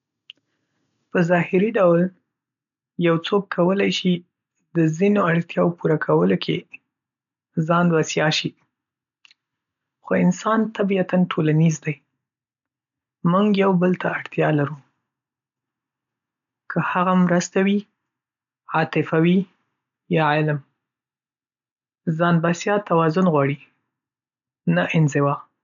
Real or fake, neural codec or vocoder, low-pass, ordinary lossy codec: real; none; 7.2 kHz; none